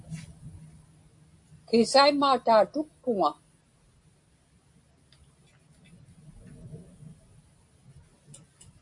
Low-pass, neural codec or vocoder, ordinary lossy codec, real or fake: 10.8 kHz; none; AAC, 64 kbps; real